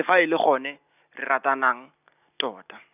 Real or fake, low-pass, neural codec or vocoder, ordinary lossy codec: real; 3.6 kHz; none; none